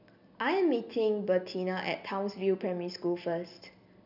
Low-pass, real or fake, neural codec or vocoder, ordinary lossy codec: 5.4 kHz; real; none; none